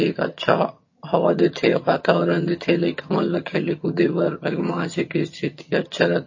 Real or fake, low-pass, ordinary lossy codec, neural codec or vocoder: fake; 7.2 kHz; MP3, 32 kbps; vocoder, 22.05 kHz, 80 mel bands, HiFi-GAN